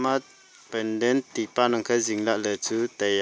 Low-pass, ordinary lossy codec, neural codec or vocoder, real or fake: none; none; none; real